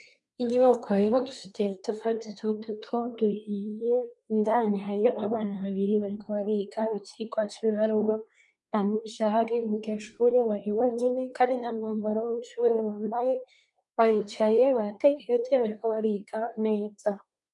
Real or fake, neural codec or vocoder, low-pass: fake; codec, 24 kHz, 1 kbps, SNAC; 10.8 kHz